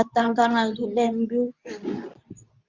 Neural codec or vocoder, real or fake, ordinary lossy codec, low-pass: codec, 24 kHz, 0.9 kbps, WavTokenizer, medium speech release version 2; fake; Opus, 64 kbps; 7.2 kHz